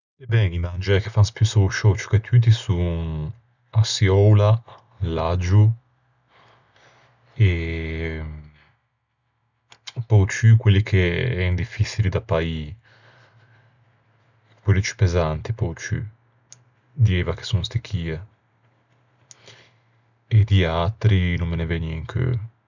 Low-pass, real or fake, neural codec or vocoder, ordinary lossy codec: 7.2 kHz; real; none; none